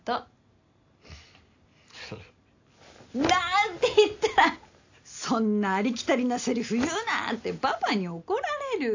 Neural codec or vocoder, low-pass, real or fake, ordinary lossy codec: none; 7.2 kHz; real; AAC, 48 kbps